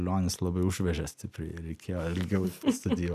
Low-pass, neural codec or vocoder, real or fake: 14.4 kHz; none; real